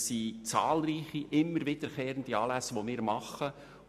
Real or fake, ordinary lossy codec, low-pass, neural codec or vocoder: real; MP3, 96 kbps; 14.4 kHz; none